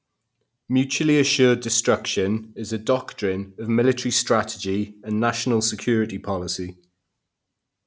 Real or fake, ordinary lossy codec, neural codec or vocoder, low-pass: real; none; none; none